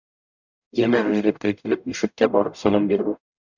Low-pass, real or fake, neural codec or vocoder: 7.2 kHz; fake; codec, 44.1 kHz, 0.9 kbps, DAC